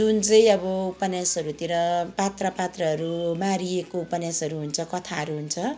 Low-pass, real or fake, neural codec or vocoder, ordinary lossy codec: none; real; none; none